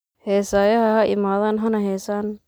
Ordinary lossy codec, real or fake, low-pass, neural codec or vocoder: none; real; none; none